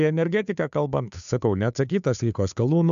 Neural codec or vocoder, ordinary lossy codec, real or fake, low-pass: codec, 16 kHz, 2 kbps, FunCodec, trained on Chinese and English, 25 frames a second; AAC, 96 kbps; fake; 7.2 kHz